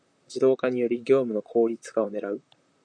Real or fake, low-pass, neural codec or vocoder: fake; 9.9 kHz; vocoder, 44.1 kHz, 128 mel bands, Pupu-Vocoder